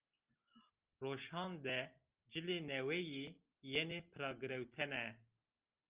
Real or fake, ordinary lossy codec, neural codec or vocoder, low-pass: real; Opus, 24 kbps; none; 3.6 kHz